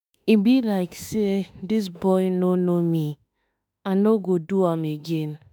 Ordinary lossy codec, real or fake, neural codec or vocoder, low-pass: none; fake; autoencoder, 48 kHz, 32 numbers a frame, DAC-VAE, trained on Japanese speech; none